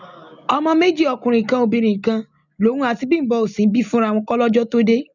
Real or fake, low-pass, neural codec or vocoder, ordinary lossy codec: real; 7.2 kHz; none; none